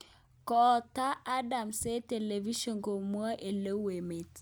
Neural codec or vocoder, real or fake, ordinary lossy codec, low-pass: none; real; none; none